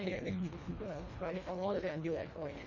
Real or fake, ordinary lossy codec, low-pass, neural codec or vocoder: fake; none; 7.2 kHz; codec, 24 kHz, 1.5 kbps, HILCodec